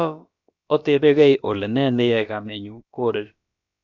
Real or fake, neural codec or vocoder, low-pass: fake; codec, 16 kHz, about 1 kbps, DyCAST, with the encoder's durations; 7.2 kHz